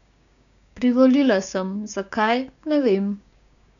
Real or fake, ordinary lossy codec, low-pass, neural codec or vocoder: fake; none; 7.2 kHz; codec, 16 kHz, 6 kbps, DAC